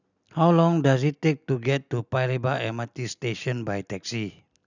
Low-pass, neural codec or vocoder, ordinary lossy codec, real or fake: 7.2 kHz; none; none; real